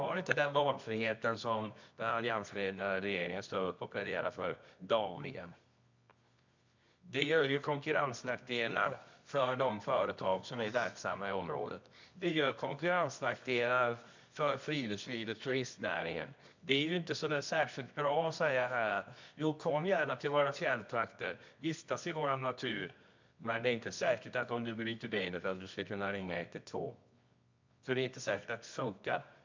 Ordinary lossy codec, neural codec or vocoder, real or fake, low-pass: MP3, 64 kbps; codec, 24 kHz, 0.9 kbps, WavTokenizer, medium music audio release; fake; 7.2 kHz